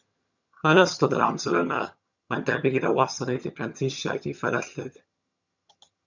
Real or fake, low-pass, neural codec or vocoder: fake; 7.2 kHz; vocoder, 22.05 kHz, 80 mel bands, HiFi-GAN